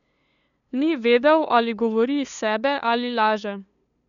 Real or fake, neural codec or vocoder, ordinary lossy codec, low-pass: fake; codec, 16 kHz, 2 kbps, FunCodec, trained on LibriTTS, 25 frames a second; none; 7.2 kHz